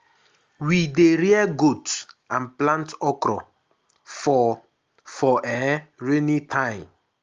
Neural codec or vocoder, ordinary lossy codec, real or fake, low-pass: none; Opus, 32 kbps; real; 7.2 kHz